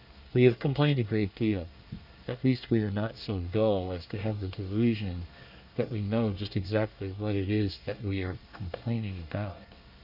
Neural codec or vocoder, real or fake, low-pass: codec, 24 kHz, 1 kbps, SNAC; fake; 5.4 kHz